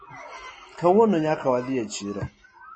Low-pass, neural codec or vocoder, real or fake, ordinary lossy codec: 10.8 kHz; none; real; MP3, 32 kbps